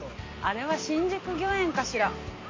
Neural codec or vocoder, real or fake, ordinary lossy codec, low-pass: none; real; MP3, 32 kbps; 7.2 kHz